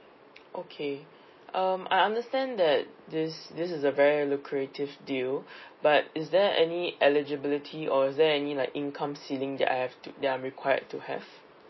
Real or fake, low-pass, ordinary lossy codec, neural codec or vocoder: real; 7.2 kHz; MP3, 24 kbps; none